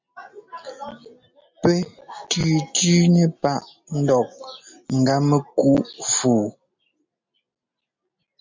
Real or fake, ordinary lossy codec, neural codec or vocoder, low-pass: real; MP3, 64 kbps; none; 7.2 kHz